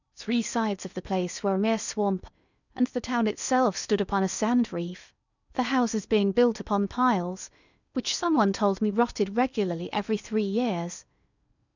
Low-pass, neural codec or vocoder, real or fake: 7.2 kHz; codec, 16 kHz in and 24 kHz out, 0.8 kbps, FocalCodec, streaming, 65536 codes; fake